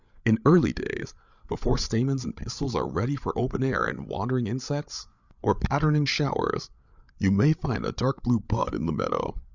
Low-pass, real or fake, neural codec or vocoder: 7.2 kHz; fake; codec, 16 kHz, 8 kbps, FreqCodec, larger model